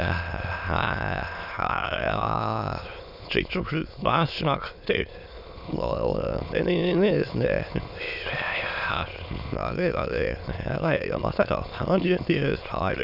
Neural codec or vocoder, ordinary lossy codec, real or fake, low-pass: autoencoder, 22.05 kHz, a latent of 192 numbers a frame, VITS, trained on many speakers; none; fake; 5.4 kHz